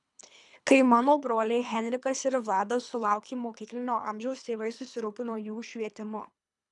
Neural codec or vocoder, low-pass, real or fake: codec, 24 kHz, 3 kbps, HILCodec; 10.8 kHz; fake